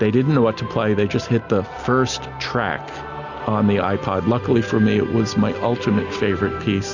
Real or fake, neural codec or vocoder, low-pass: real; none; 7.2 kHz